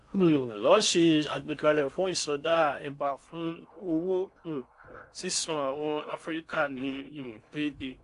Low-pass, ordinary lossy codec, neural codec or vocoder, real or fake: 10.8 kHz; AAC, 64 kbps; codec, 16 kHz in and 24 kHz out, 0.6 kbps, FocalCodec, streaming, 4096 codes; fake